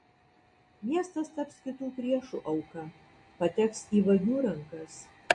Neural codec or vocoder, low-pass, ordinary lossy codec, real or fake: none; 10.8 kHz; MP3, 48 kbps; real